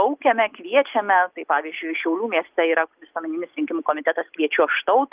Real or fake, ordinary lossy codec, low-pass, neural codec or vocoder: real; Opus, 32 kbps; 3.6 kHz; none